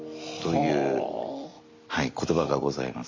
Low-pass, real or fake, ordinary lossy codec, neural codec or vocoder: 7.2 kHz; real; MP3, 64 kbps; none